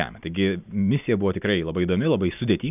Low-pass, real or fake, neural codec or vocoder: 3.6 kHz; real; none